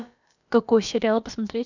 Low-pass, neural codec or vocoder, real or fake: 7.2 kHz; codec, 16 kHz, about 1 kbps, DyCAST, with the encoder's durations; fake